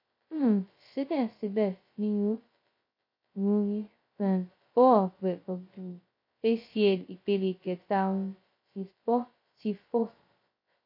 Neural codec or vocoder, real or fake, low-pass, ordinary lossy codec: codec, 16 kHz, 0.2 kbps, FocalCodec; fake; 5.4 kHz; MP3, 32 kbps